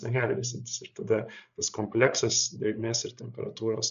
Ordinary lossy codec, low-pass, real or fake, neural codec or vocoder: AAC, 96 kbps; 7.2 kHz; fake; codec, 16 kHz, 8 kbps, FreqCodec, larger model